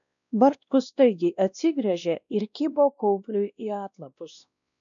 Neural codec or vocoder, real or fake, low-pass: codec, 16 kHz, 1 kbps, X-Codec, WavLM features, trained on Multilingual LibriSpeech; fake; 7.2 kHz